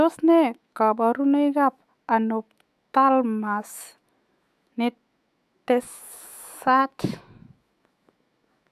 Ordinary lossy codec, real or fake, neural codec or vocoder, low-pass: AAC, 96 kbps; fake; autoencoder, 48 kHz, 128 numbers a frame, DAC-VAE, trained on Japanese speech; 14.4 kHz